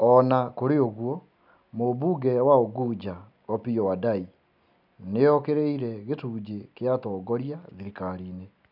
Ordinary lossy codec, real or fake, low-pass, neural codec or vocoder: none; real; 5.4 kHz; none